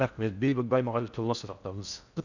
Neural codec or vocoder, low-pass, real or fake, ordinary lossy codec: codec, 16 kHz in and 24 kHz out, 0.6 kbps, FocalCodec, streaming, 4096 codes; 7.2 kHz; fake; none